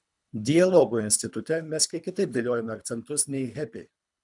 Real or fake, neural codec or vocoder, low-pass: fake; codec, 24 kHz, 3 kbps, HILCodec; 10.8 kHz